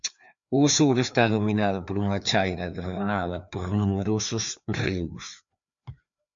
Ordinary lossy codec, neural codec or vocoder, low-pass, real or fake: MP3, 64 kbps; codec, 16 kHz, 2 kbps, FreqCodec, larger model; 7.2 kHz; fake